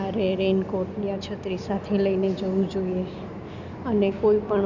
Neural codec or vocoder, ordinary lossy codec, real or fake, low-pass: none; none; real; 7.2 kHz